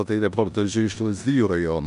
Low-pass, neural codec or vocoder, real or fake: 10.8 kHz; codec, 16 kHz in and 24 kHz out, 0.9 kbps, LongCat-Audio-Codec, four codebook decoder; fake